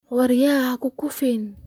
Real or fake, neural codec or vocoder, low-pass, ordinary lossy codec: fake; vocoder, 44.1 kHz, 128 mel bands, Pupu-Vocoder; 19.8 kHz; none